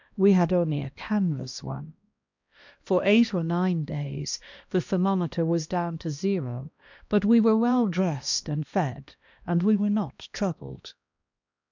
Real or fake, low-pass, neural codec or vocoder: fake; 7.2 kHz; codec, 16 kHz, 1 kbps, X-Codec, HuBERT features, trained on balanced general audio